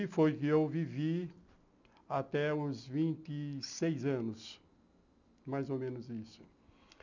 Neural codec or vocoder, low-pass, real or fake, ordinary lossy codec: none; 7.2 kHz; real; none